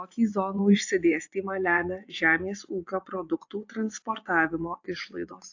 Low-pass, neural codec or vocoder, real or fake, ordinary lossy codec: 7.2 kHz; vocoder, 24 kHz, 100 mel bands, Vocos; fake; AAC, 48 kbps